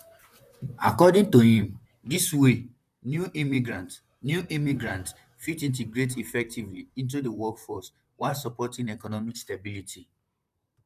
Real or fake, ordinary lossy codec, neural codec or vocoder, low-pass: fake; none; vocoder, 44.1 kHz, 128 mel bands, Pupu-Vocoder; 14.4 kHz